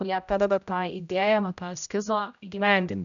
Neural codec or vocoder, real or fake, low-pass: codec, 16 kHz, 0.5 kbps, X-Codec, HuBERT features, trained on general audio; fake; 7.2 kHz